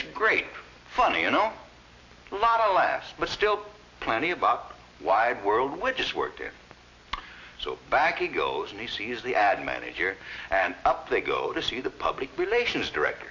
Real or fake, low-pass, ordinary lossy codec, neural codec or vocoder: real; 7.2 kHz; AAC, 48 kbps; none